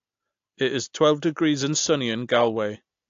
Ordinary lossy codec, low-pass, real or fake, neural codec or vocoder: AAC, 48 kbps; 7.2 kHz; real; none